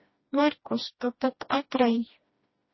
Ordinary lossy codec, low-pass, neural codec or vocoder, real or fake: MP3, 24 kbps; 7.2 kHz; codec, 16 kHz, 1 kbps, FreqCodec, smaller model; fake